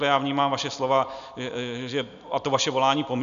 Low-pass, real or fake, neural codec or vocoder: 7.2 kHz; real; none